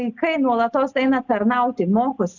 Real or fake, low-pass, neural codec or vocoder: real; 7.2 kHz; none